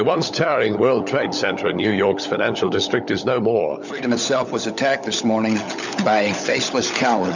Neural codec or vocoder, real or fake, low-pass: codec, 16 kHz, 16 kbps, FunCodec, trained on LibriTTS, 50 frames a second; fake; 7.2 kHz